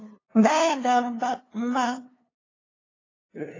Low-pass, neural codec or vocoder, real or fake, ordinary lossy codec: 7.2 kHz; codec, 16 kHz, 2 kbps, FunCodec, trained on LibriTTS, 25 frames a second; fake; AAC, 32 kbps